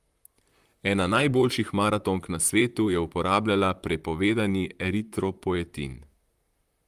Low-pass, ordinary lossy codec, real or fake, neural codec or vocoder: 14.4 kHz; Opus, 32 kbps; fake; vocoder, 44.1 kHz, 128 mel bands, Pupu-Vocoder